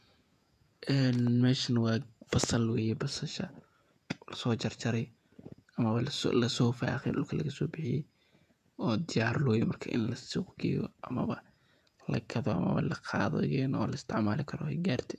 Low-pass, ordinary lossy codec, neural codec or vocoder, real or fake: 14.4 kHz; none; vocoder, 48 kHz, 128 mel bands, Vocos; fake